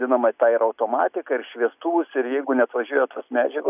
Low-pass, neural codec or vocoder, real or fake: 3.6 kHz; none; real